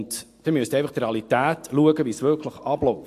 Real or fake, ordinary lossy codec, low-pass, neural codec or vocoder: real; AAC, 64 kbps; 14.4 kHz; none